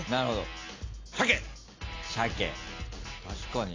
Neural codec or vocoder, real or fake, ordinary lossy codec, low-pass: none; real; AAC, 32 kbps; 7.2 kHz